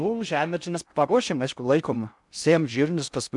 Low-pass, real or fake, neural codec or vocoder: 10.8 kHz; fake; codec, 16 kHz in and 24 kHz out, 0.6 kbps, FocalCodec, streaming, 2048 codes